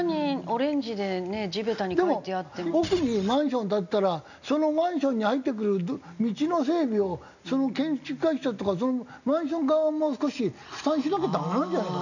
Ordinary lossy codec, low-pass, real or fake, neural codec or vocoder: none; 7.2 kHz; real; none